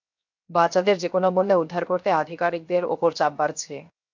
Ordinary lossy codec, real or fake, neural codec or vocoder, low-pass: MP3, 64 kbps; fake; codec, 16 kHz, 0.7 kbps, FocalCodec; 7.2 kHz